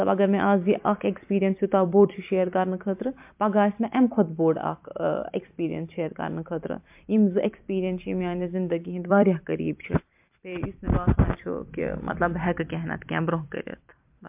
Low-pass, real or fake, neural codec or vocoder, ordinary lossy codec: 3.6 kHz; fake; vocoder, 44.1 kHz, 128 mel bands every 256 samples, BigVGAN v2; MP3, 32 kbps